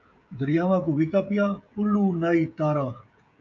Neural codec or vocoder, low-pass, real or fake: codec, 16 kHz, 16 kbps, FreqCodec, smaller model; 7.2 kHz; fake